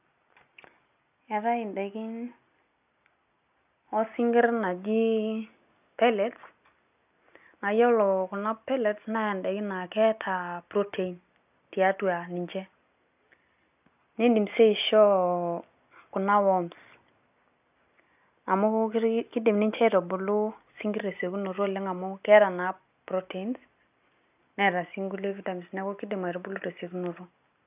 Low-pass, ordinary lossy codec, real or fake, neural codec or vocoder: 3.6 kHz; none; real; none